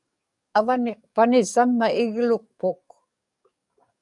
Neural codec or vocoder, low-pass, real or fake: codec, 44.1 kHz, 7.8 kbps, DAC; 10.8 kHz; fake